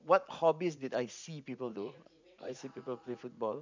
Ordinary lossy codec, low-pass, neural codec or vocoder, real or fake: MP3, 64 kbps; 7.2 kHz; vocoder, 44.1 kHz, 80 mel bands, Vocos; fake